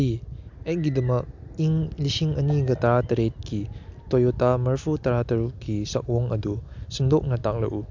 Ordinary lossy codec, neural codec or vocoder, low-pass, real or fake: MP3, 64 kbps; none; 7.2 kHz; real